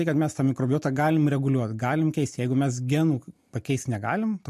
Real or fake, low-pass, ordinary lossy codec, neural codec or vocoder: real; 14.4 kHz; MP3, 64 kbps; none